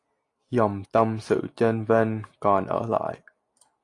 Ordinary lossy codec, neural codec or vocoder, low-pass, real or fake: AAC, 48 kbps; none; 10.8 kHz; real